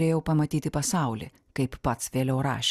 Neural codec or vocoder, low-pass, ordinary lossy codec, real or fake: none; 14.4 kHz; Opus, 64 kbps; real